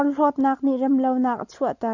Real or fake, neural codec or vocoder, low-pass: real; none; 7.2 kHz